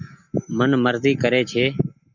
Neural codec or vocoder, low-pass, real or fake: none; 7.2 kHz; real